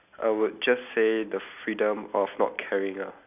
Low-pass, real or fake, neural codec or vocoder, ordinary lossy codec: 3.6 kHz; real; none; none